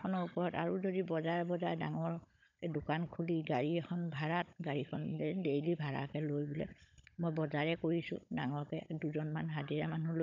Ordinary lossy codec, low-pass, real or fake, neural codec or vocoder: none; 7.2 kHz; fake; codec, 16 kHz, 16 kbps, FunCodec, trained on Chinese and English, 50 frames a second